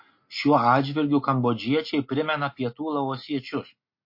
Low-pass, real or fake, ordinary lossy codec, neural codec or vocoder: 5.4 kHz; real; MP3, 32 kbps; none